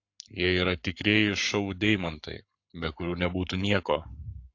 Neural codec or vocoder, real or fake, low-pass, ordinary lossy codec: codec, 44.1 kHz, 7.8 kbps, Pupu-Codec; fake; 7.2 kHz; AAC, 48 kbps